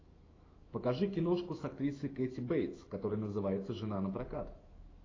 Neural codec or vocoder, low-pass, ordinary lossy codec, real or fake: autoencoder, 48 kHz, 128 numbers a frame, DAC-VAE, trained on Japanese speech; 7.2 kHz; AAC, 32 kbps; fake